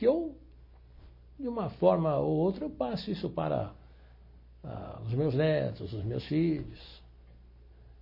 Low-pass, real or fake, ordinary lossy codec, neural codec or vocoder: 5.4 kHz; real; MP3, 24 kbps; none